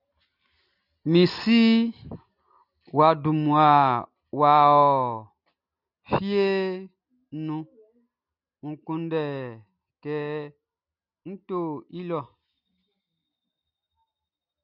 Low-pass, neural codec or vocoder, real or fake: 5.4 kHz; none; real